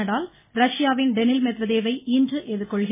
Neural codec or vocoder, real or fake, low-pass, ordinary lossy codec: none; real; 3.6 kHz; MP3, 16 kbps